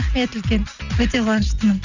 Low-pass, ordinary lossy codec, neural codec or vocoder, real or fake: 7.2 kHz; none; none; real